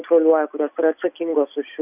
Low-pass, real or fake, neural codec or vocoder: 3.6 kHz; real; none